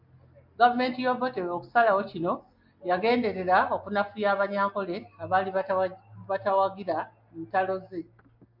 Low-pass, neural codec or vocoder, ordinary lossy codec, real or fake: 5.4 kHz; none; MP3, 48 kbps; real